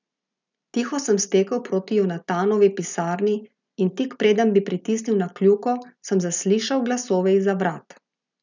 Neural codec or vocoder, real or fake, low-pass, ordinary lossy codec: none; real; 7.2 kHz; none